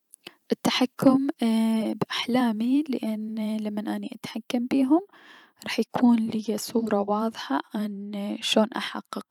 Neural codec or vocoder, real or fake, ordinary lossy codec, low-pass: vocoder, 44.1 kHz, 128 mel bands every 512 samples, BigVGAN v2; fake; none; 19.8 kHz